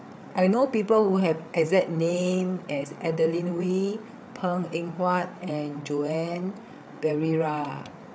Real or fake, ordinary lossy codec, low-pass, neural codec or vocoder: fake; none; none; codec, 16 kHz, 8 kbps, FreqCodec, larger model